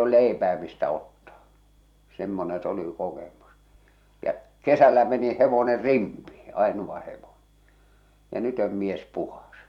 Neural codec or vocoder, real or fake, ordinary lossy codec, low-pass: vocoder, 44.1 kHz, 128 mel bands every 256 samples, BigVGAN v2; fake; none; 19.8 kHz